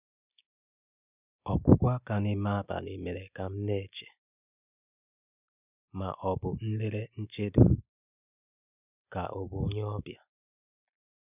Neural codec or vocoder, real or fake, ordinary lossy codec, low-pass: none; real; none; 3.6 kHz